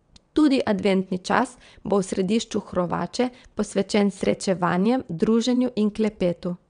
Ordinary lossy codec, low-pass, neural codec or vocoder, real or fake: none; 9.9 kHz; vocoder, 22.05 kHz, 80 mel bands, WaveNeXt; fake